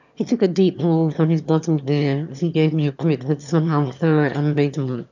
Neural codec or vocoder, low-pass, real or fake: autoencoder, 22.05 kHz, a latent of 192 numbers a frame, VITS, trained on one speaker; 7.2 kHz; fake